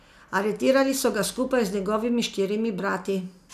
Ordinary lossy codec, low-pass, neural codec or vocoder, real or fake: none; 14.4 kHz; none; real